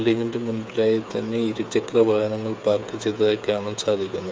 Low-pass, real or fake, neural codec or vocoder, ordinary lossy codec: none; fake; codec, 16 kHz, 8 kbps, FreqCodec, smaller model; none